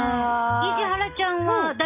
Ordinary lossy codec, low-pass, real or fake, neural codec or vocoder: none; 3.6 kHz; real; none